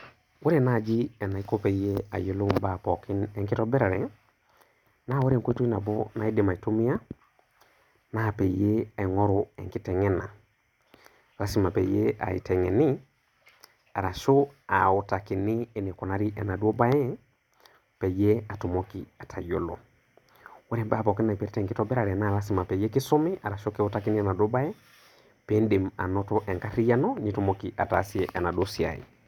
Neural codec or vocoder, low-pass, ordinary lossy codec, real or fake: none; 19.8 kHz; none; real